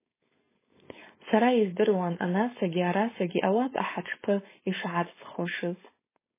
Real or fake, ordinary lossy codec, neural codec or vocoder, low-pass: fake; MP3, 16 kbps; codec, 16 kHz, 4.8 kbps, FACodec; 3.6 kHz